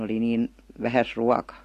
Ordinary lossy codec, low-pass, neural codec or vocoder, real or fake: AAC, 48 kbps; 14.4 kHz; none; real